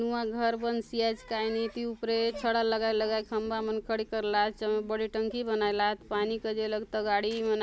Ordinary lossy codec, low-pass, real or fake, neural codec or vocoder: none; none; real; none